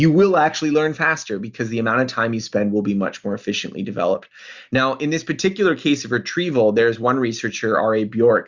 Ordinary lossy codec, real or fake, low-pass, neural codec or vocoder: Opus, 64 kbps; real; 7.2 kHz; none